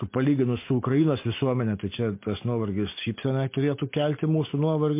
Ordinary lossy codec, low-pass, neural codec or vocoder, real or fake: MP3, 24 kbps; 3.6 kHz; none; real